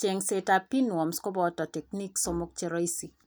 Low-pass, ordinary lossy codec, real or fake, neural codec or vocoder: none; none; real; none